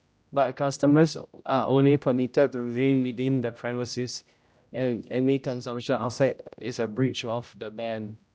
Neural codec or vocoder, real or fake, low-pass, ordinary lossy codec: codec, 16 kHz, 0.5 kbps, X-Codec, HuBERT features, trained on general audio; fake; none; none